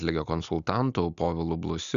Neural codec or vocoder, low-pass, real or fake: none; 7.2 kHz; real